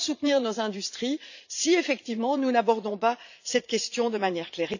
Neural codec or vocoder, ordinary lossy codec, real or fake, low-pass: vocoder, 44.1 kHz, 80 mel bands, Vocos; none; fake; 7.2 kHz